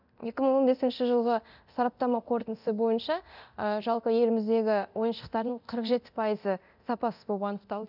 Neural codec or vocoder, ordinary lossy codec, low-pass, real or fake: codec, 24 kHz, 0.9 kbps, DualCodec; none; 5.4 kHz; fake